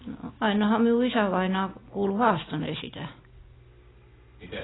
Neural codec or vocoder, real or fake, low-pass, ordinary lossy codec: none; real; 7.2 kHz; AAC, 16 kbps